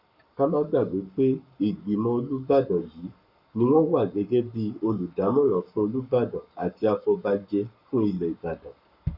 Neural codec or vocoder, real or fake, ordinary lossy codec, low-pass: codec, 44.1 kHz, 7.8 kbps, Pupu-Codec; fake; none; 5.4 kHz